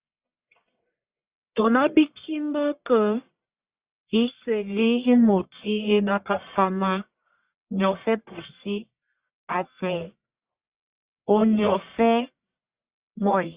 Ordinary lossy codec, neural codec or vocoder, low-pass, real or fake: Opus, 64 kbps; codec, 44.1 kHz, 1.7 kbps, Pupu-Codec; 3.6 kHz; fake